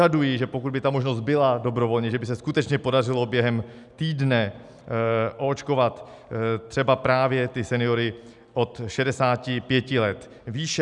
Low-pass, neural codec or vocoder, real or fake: 10.8 kHz; none; real